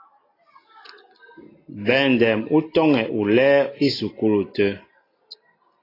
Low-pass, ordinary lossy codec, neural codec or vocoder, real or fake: 5.4 kHz; AAC, 24 kbps; none; real